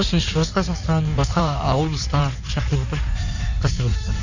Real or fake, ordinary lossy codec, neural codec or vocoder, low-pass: fake; none; codec, 16 kHz in and 24 kHz out, 1.1 kbps, FireRedTTS-2 codec; 7.2 kHz